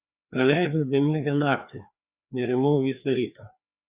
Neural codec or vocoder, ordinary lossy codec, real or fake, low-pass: codec, 16 kHz, 2 kbps, FreqCodec, larger model; Opus, 64 kbps; fake; 3.6 kHz